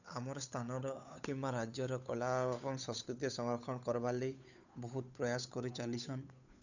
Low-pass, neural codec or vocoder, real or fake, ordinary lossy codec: 7.2 kHz; codec, 16 kHz, 8 kbps, FunCodec, trained on Chinese and English, 25 frames a second; fake; none